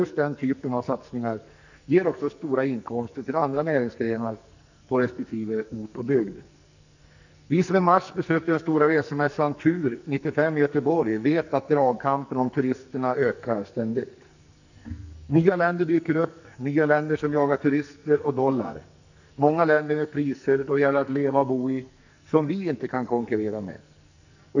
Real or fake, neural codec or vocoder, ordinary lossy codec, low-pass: fake; codec, 44.1 kHz, 2.6 kbps, SNAC; none; 7.2 kHz